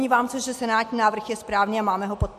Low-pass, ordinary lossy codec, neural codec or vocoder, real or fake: 14.4 kHz; MP3, 64 kbps; none; real